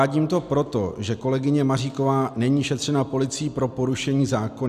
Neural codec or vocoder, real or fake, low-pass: none; real; 14.4 kHz